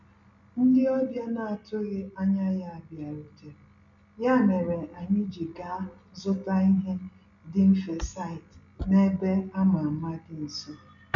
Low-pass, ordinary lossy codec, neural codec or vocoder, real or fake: 7.2 kHz; none; none; real